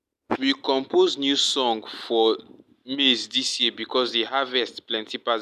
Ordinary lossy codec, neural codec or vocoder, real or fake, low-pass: none; none; real; 14.4 kHz